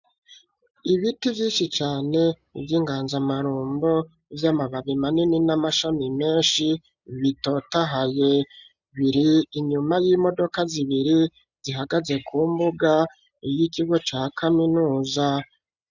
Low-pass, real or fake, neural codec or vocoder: 7.2 kHz; real; none